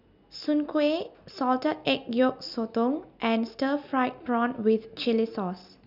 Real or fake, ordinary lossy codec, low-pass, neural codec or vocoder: real; none; 5.4 kHz; none